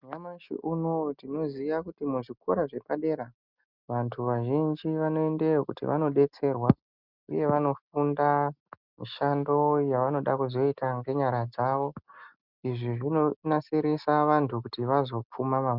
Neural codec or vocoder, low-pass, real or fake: none; 5.4 kHz; real